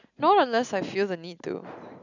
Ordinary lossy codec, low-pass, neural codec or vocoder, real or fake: none; 7.2 kHz; none; real